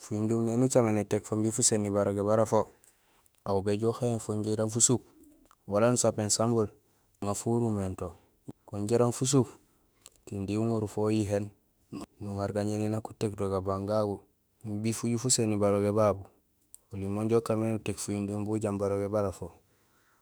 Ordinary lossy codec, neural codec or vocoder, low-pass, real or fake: none; autoencoder, 48 kHz, 32 numbers a frame, DAC-VAE, trained on Japanese speech; none; fake